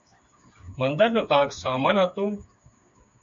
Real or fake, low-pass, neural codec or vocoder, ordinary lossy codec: fake; 7.2 kHz; codec, 16 kHz, 4 kbps, FreqCodec, smaller model; MP3, 64 kbps